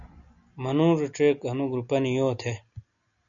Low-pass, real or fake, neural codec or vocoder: 7.2 kHz; real; none